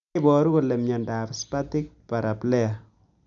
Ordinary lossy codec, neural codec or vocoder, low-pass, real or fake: none; none; 7.2 kHz; real